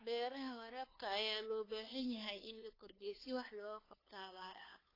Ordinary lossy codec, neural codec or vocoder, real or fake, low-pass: AAC, 24 kbps; codec, 16 kHz, 2 kbps, FunCodec, trained on LibriTTS, 25 frames a second; fake; 5.4 kHz